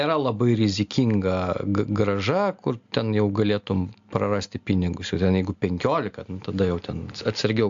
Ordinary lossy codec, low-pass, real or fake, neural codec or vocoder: AAC, 64 kbps; 7.2 kHz; real; none